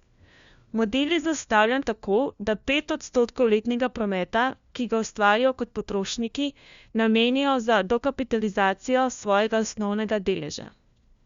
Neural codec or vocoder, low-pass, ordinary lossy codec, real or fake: codec, 16 kHz, 1 kbps, FunCodec, trained on LibriTTS, 50 frames a second; 7.2 kHz; Opus, 64 kbps; fake